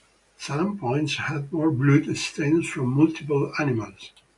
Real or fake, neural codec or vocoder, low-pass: real; none; 10.8 kHz